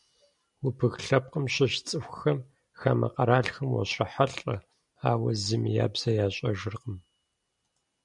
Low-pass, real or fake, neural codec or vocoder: 10.8 kHz; real; none